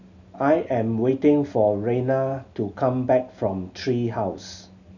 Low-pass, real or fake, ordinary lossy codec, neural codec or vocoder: 7.2 kHz; real; none; none